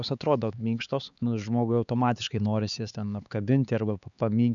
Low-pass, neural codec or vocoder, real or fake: 7.2 kHz; codec, 16 kHz, 4 kbps, X-Codec, HuBERT features, trained on LibriSpeech; fake